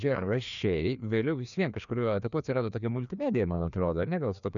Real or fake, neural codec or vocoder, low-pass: fake; codec, 16 kHz, 2 kbps, FreqCodec, larger model; 7.2 kHz